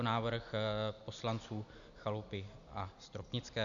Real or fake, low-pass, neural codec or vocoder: real; 7.2 kHz; none